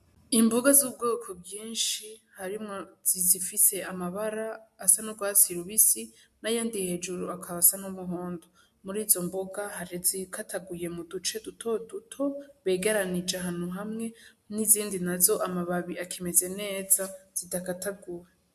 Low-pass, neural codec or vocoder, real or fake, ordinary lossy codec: 14.4 kHz; none; real; MP3, 96 kbps